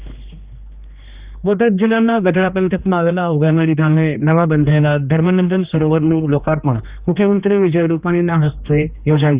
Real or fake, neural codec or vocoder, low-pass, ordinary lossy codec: fake; codec, 16 kHz, 2 kbps, X-Codec, HuBERT features, trained on general audio; 3.6 kHz; Opus, 64 kbps